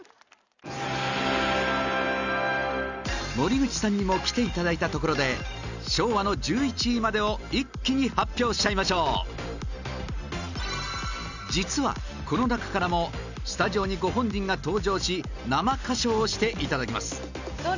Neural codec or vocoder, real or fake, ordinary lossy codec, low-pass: none; real; none; 7.2 kHz